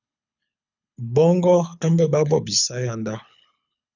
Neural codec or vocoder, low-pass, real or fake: codec, 24 kHz, 6 kbps, HILCodec; 7.2 kHz; fake